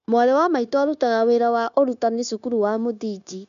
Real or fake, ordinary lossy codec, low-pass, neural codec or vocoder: fake; none; 7.2 kHz; codec, 16 kHz, 0.9 kbps, LongCat-Audio-Codec